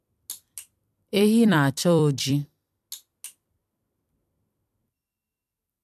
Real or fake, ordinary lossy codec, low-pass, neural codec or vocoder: fake; MP3, 96 kbps; 14.4 kHz; vocoder, 44.1 kHz, 128 mel bands every 256 samples, BigVGAN v2